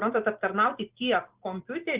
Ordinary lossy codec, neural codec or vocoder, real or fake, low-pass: Opus, 16 kbps; none; real; 3.6 kHz